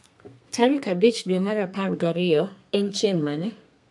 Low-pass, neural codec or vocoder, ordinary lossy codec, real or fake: 10.8 kHz; codec, 32 kHz, 1.9 kbps, SNAC; MP3, 64 kbps; fake